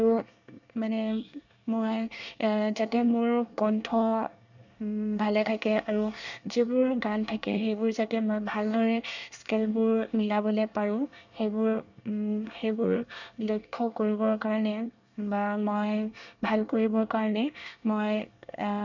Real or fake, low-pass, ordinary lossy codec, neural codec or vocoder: fake; 7.2 kHz; none; codec, 24 kHz, 1 kbps, SNAC